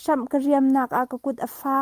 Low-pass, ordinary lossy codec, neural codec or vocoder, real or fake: 14.4 kHz; Opus, 32 kbps; none; real